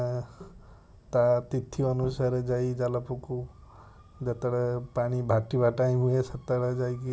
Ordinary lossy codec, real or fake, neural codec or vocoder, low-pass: none; real; none; none